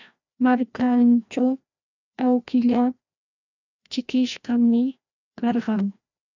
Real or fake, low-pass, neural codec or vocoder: fake; 7.2 kHz; codec, 16 kHz, 1 kbps, FreqCodec, larger model